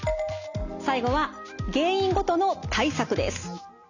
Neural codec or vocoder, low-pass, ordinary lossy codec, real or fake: none; 7.2 kHz; none; real